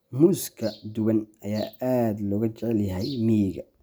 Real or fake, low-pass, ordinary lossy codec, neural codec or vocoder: real; none; none; none